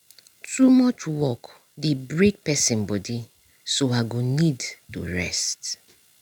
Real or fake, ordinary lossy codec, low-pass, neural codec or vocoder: real; none; 19.8 kHz; none